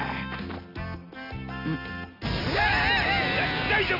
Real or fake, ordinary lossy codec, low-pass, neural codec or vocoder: real; none; 5.4 kHz; none